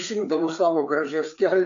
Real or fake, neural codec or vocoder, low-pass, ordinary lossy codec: fake; codec, 16 kHz, 4 kbps, FreqCodec, larger model; 7.2 kHz; AAC, 64 kbps